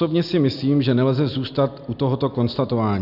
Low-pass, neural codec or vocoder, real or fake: 5.4 kHz; none; real